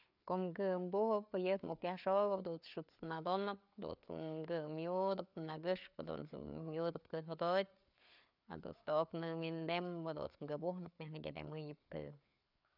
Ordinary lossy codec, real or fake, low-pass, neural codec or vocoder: none; fake; 5.4 kHz; codec, 16 kHz, 4 kbps, FreqCodec, larger model